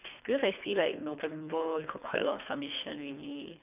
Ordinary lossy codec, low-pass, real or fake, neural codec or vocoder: none; 3.6 kHz; fake; codec, 24 kHz, 3 kbps, HILCodec